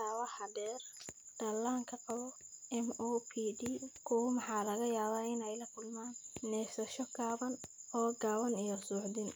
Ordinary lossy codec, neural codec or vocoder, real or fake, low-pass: none; none; real; none